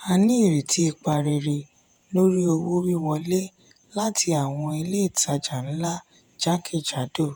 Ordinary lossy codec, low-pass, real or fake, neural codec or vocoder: none; none; fake; vocoder, 48 kHz, 128 mel bands, Vocos